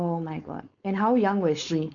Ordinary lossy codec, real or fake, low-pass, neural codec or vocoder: none; fake; 7.2 kHz; codec, 16 kHz, 4.8 kbps, FACodec